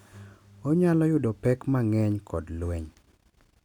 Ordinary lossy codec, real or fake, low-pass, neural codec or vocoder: none; real; 19.8 kHz; none